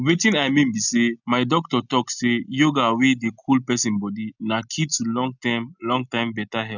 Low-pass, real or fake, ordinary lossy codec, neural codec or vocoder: 7.2 kHz; real; none; none